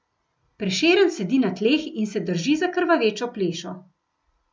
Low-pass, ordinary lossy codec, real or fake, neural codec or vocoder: none; none; real; none